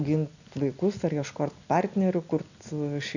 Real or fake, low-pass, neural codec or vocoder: real; 7.2 kHz; none